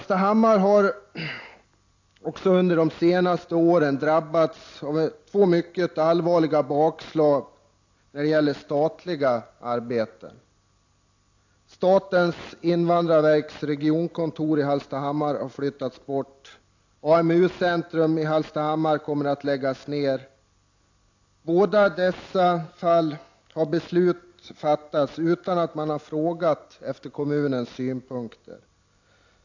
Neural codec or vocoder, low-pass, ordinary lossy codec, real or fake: none; 7.2 kHz; AAC, 48 kbps; real